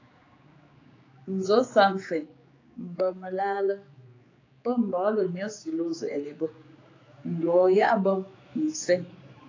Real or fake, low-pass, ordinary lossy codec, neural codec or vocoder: fake; 7.2 kHz; AAC, 32 kbps; codec, 16 kHz, 4 kbps, X-Codec, HuBERT features, trained on general audio